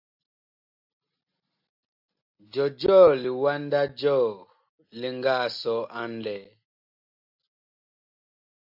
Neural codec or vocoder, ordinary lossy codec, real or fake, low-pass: none; AAC, 48 kbps; real; 5.4 kHz